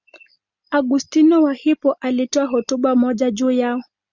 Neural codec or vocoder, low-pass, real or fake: none; 7.2 kHz; real